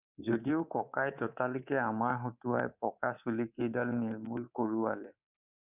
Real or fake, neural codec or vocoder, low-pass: fake; codec, 24 kHz, 3.1 kbps, DualCodec; 3.6 kHz